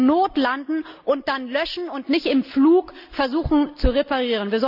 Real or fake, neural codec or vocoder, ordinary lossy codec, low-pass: real; none; none; 5.4 kHz